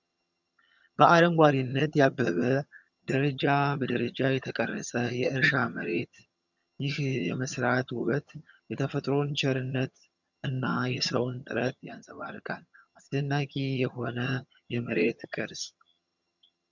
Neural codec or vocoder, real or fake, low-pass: vocoder, 22.05 kHz, 80 mel bands, HiFi-GAN; fake; 7.2 kHz